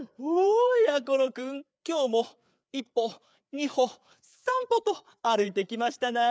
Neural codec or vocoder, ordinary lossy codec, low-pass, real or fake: codec, 16 kHz, 4 kbps, FreqCodec, larger model; none; none; fake